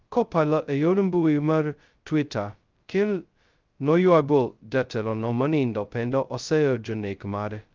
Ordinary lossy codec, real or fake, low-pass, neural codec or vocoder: Opus, 24 kbps; fake; 7.2 kHz; codec, 16 kHz, 0.2 kbps, FocalCodec